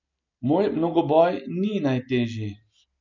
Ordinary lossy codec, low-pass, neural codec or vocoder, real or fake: none; 7.2 kHz; none; real